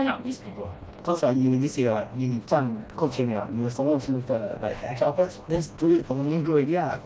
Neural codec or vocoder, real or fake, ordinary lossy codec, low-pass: codec, 16 kHz, 1 kbps, FreqCodec, smaller model; fake; none; none